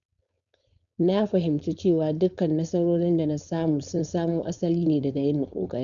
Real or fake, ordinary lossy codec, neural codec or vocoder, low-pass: fake; none; codec, 16 kHz, 4.8 kbps, FACodec; 7.2 kHz